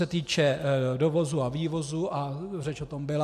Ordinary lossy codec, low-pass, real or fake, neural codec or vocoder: MP3, 64 kbps; 14.4 kHz; real; none